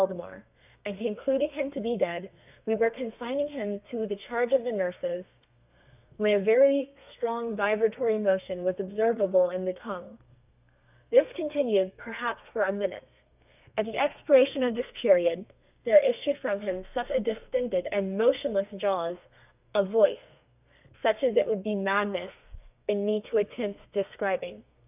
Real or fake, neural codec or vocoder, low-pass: fake; codec, 32 kHz, 1.9 kbps, SNAC; 3.6 kHz